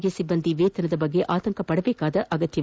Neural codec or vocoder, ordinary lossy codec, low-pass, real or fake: none; none; none; real